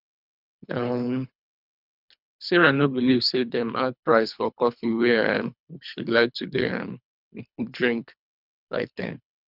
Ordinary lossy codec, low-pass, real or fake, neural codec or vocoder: none; 5.4 kHz; fake; codec, 24 kHz, 3 kbps, HILCodec